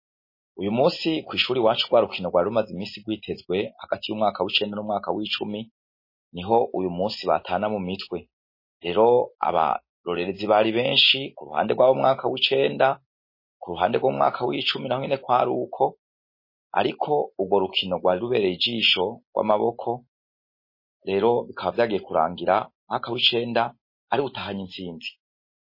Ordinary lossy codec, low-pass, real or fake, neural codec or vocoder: MP3, 24 kbps; 5.4 kHz; real; none